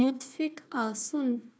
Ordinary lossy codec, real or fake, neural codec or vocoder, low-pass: none; fake; codec, 16 kHz, 1 kbps, FunCodec, trained on Chinese and English, 50 frames a second; none